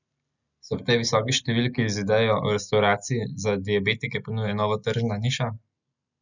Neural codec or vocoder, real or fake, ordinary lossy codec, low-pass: none; real; none; 7.2 kHz